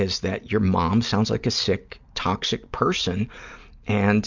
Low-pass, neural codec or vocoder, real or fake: 7.2 kHz; none; real